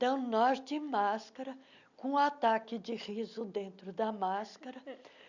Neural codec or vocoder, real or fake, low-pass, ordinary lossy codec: none; real; 7.2 kHz; none